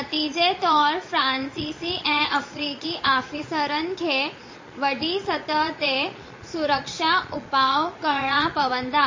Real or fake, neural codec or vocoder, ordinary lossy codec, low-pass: fake; vocoder, 22.05 kHz, 80 mel bands, Vocos; MP3, 32 kbps; 7.2 kHz